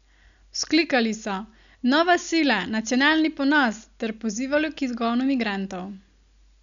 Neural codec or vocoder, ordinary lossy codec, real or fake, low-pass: none; none; real; 7.2 kHz